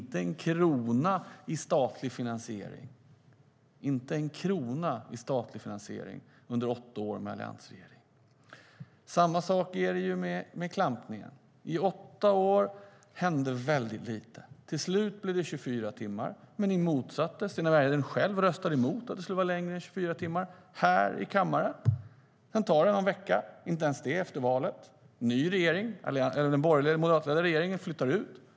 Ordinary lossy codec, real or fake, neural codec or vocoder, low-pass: none; real; none; none